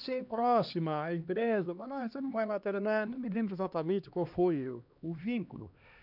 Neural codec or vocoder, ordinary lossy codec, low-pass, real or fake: codec, 16 kHz, 2 kbps, X-Codec, HuBERT features, trained on LibriSpeech; none; 5.4 kHz; fake